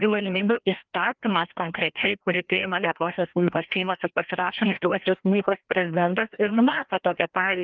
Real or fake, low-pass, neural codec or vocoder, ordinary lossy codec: fake; 7.2 kHz; codec, 16 kHz, 1 kbps, FreqCodec, larger model; Opus, 32 kbps